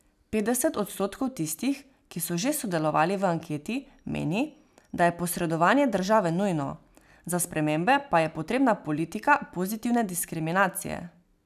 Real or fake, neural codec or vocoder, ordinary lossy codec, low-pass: real; none; none; 14.4 kHz